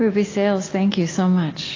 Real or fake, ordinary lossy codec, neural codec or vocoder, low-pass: real; AAC, 32 kbps; none; 7.2 kHz